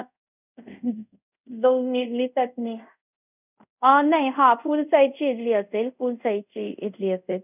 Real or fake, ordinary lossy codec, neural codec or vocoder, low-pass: fake; none; codec, 24 kHz, 0.5 kbps, DualCodec; 3.6 kHz